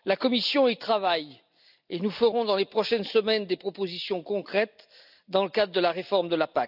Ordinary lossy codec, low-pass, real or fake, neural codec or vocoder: none; 5.4 kHz; real; none